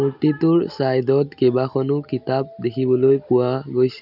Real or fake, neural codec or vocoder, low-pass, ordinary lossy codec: real; none; 5.4 kHz; none